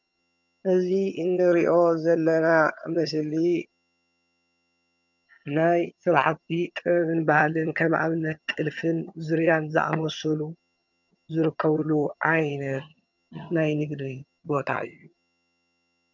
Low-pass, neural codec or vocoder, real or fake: 7.2 kHz; vocoder, 22.05 kHz, 80 mel bands, HiFi-GAN; fake